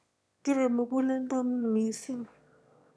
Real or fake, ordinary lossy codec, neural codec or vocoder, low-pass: fake; none; autoencoder, 22.05 kHz, a latent of 192 numbers a frame, VITS, trained on one speaker; none